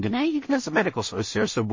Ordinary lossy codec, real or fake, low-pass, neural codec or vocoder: MP3, 32 kbps; fake; 7.2 kHz; codec, 16 kHz in and 24 kHz out, 0.4 kbps, LongCat-Audio-Codec, two codebook decoder